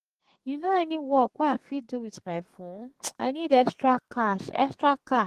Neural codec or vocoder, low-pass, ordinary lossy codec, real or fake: codec, 32 kHz, 1.9 kbps, SNAC; 14.4 kHz; Opus, 16 kbps; fake